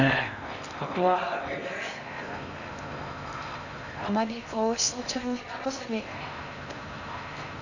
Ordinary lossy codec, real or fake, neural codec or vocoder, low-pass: none; fake; codec, 16 kHz in and 24 kHz out, 0.6 kbps, FocalCodec, streaming, 4096 codes; 7.2 kHz